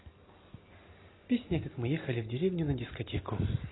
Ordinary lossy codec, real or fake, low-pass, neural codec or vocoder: AAC, 16 kbps; real; 7.2 kHz; none